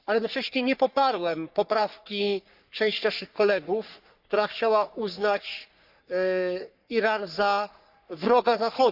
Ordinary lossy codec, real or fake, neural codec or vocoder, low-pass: Opus, 64 kbps; fake; codec, 44.1 kHz, 3.4 kbps, Pupu-Codec; 5.4 kHz